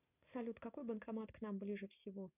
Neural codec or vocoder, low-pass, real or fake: none; 3.6 kHz; real